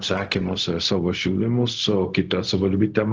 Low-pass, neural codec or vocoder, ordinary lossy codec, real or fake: 7.2 kHz; codec, 16 kHz, 0.4 kbps, LongCat-Audio-Codec; Opus, 16 kbps; fake